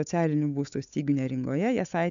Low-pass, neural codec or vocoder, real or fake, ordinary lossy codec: 7.2 kHz; codec, 16 kHz, 8 kbps, FunCodec, trained on Chinese and English, 25 frames a second; fake; AAC, 96 kbps